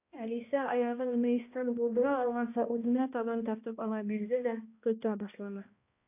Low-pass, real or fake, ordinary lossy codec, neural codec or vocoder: 3.6 kHz; fake; AAC, 32 kbps; codec, 16 kHz, 1 kbps, X-Codec, HuBERT features, trained on balanced general audio